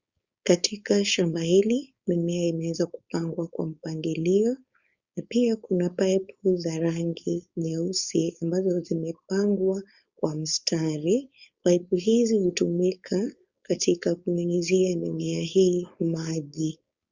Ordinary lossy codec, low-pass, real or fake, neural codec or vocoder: Opus, 64 kbps; 7.2 kHz; fake; codec, 16 kHz, 4.8 kbps, FACodec